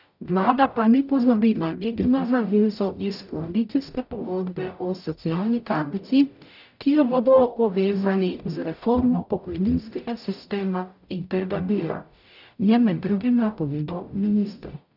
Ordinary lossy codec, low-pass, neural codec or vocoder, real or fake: none; 5.4 kHz; codec, 44.1 kHz, 0.9 kbps, DAC; fake